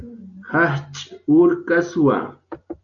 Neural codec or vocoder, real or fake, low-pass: none; real; 7.2 kHz